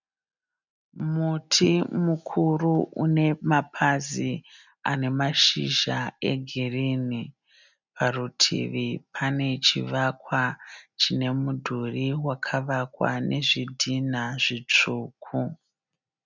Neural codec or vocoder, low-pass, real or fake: none; 7.2 kHz; real